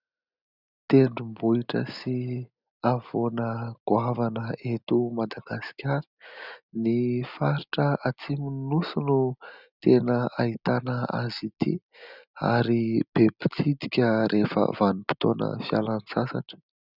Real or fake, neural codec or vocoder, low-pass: real; none; 5.4 kHz